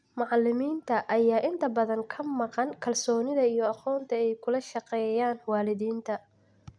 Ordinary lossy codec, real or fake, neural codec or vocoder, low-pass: none; real; none; 9.9 kHz